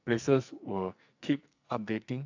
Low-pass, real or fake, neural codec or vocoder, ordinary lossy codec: 7.2 kHz; fake; codec, 44.1 kHz, 2.6 kbps, SNAC; AAC, 48 kbps